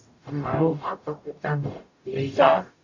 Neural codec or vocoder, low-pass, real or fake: codec, 44.1 kHz, 0.9 kbps, DAC; 7.2 kHz; fake